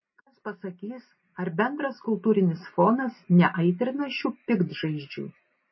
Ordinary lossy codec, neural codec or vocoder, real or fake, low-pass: MP3, 24 kbps; none; real; 7.2 kHz